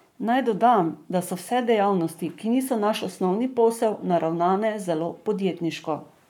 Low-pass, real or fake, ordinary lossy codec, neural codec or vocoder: 19.8 kHz; fake; none; codec, 44.1 kHz, 7.8 kbps, Pupu-Codec